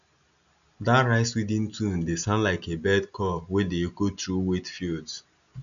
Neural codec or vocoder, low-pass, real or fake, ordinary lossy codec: none; 7.2 kHz; real; none